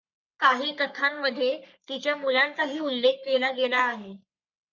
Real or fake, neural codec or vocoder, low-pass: fake; codec, 44.1 kHz, 3.4 kbps, Pupu-Codec; 7.2 kHz